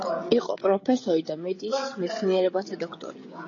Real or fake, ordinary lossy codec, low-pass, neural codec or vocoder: fake; AAC, 32 kbps; 7.2 kHz; codec, 16 kHz, 16 kbps, FreqCodec, smaller model